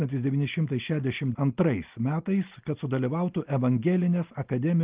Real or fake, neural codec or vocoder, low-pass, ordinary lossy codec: real; none; 3.6 kHz; Opus, 32 kbps